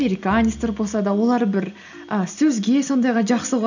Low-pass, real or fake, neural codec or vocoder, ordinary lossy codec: 7.2 kHz; real; none; none